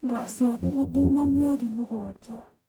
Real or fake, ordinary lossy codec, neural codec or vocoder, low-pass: fake; none; codec, 44.1 kHz, 0.9 kbps, DAC; none